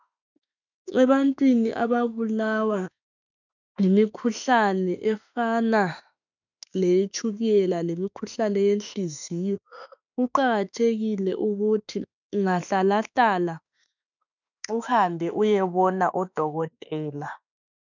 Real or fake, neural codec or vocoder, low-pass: fake; autoencoder, 48 kHz, 32 numbers a frame, DAC-VAE, trained on Japanese speech; 7.2 kHz